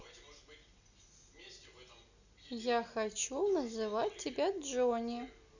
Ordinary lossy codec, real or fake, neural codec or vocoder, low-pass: none; real; none; 7.2 kHz